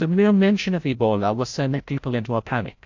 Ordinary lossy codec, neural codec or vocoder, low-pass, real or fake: AAC, 48 kbps; codec, 16 kHz, 0.5 kbps, FreqCodec, larger model; 7.2 kHz; fake